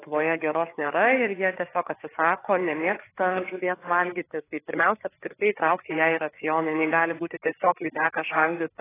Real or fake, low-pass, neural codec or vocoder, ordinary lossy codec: fake; 3.6 kHz; codec, 16 kHz, 4 kbps, FreqCodec, larger model; AAC, 16 kbps